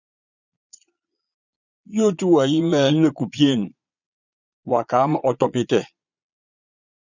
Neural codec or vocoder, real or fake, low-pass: vocoder, 22.05 kHz, 80 mel bands, Vocos; fake; 7.2 kHz